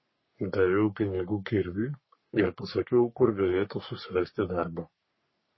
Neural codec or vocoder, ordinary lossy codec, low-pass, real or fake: codec, 44.1 kHz, 3.4 kbps, Pupu-Codec; MP3, 24 kbps; 7.2 kHz; fake